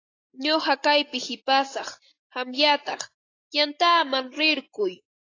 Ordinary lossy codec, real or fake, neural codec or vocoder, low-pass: AAC, 32 kbps; real; none; 7.2 kHz